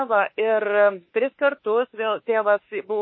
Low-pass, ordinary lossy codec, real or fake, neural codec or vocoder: 7.2 kHz; MP3, 24 kbps; fake; autoencoder, 48 kHz, 32 numbers a frame, DAC-VAE, trained on Japanese speech